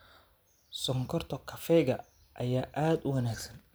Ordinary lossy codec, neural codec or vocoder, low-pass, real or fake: none; none; none; real